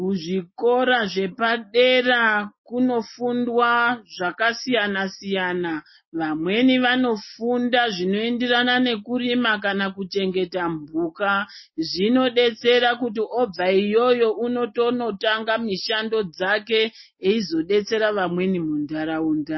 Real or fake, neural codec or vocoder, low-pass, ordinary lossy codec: real; none; 7.2 kHz; MP3, 24 kbps